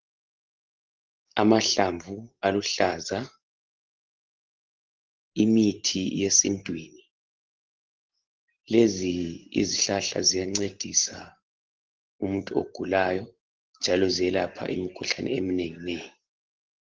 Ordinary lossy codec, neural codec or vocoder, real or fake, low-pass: Opus, 16 kbps; none; real; 7.2 kHz